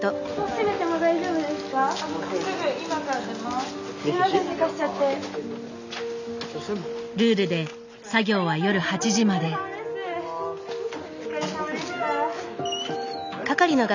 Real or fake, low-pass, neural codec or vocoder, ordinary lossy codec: real; 7.2 kHz; none; none